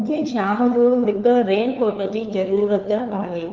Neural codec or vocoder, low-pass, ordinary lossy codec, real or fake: codec, 16 kHz, 2 kbps, FunCodec, trained on LibriTTS, 25 frames a second; 7.2 kHz; Opus, 32 kbps; fake